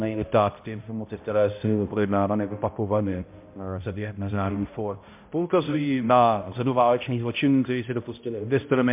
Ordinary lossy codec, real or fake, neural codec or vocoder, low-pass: MP3, 32 kbps; fake; codec, 16 kHz, 0.5 kbps, X-Codec, HuBERT features, trained on balanced general audio; 3.6 kHz